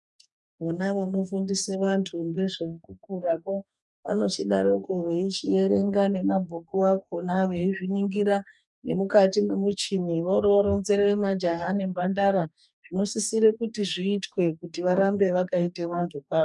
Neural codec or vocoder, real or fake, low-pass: codec, 44.1 kHz, 2.6 kbps, DAC; fake; 10.8 kHz